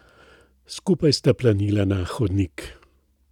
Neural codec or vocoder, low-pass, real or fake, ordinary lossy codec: none; 19.8 kHz; real; none